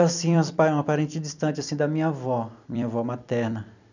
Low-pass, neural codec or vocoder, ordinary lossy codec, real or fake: 7.2 kHz; vocoder, 44.1 kHz, 128 mel bands every 256 samples, BigVGAN v2; none; fake